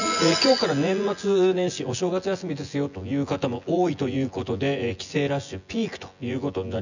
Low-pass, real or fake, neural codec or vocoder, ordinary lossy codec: 7.2 kHz; fake; vocoder, 24 kHz, 100 mel bands, Vocos; none